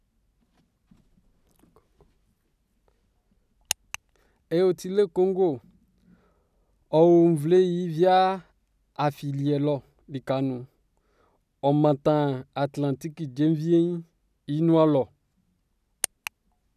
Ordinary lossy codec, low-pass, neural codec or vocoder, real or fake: none; 14.4 kHz; none; real